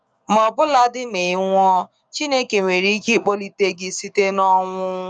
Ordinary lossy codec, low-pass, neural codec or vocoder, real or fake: none; 9.9 kHz; codec, 44.1 kHz, 7.8 kbps, DAC; fake